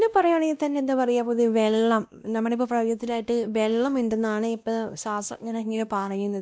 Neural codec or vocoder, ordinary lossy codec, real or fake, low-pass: codec, 16 kHz, 1 kbps, X-Codec, WavLM features, trained on Multilingual LibriSpeech; none; fake; none